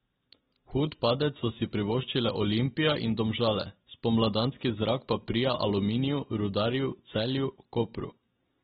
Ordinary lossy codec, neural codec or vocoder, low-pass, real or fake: AAC, 16 kbps; none; 19.8 kHz; real